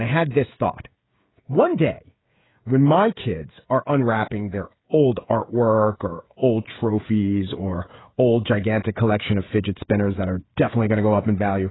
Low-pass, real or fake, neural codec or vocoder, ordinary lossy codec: 7.2 kHz; fake; codec, 16 kHz, 8 kbps, FreqCodec, smaller model; AAC, 16 kbps